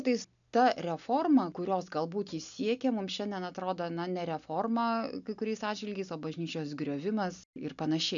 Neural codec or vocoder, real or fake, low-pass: none; real; 7.2 kHz